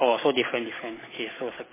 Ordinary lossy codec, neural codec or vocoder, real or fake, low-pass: MP3, 16 kbps; none; real; 3.6 kHz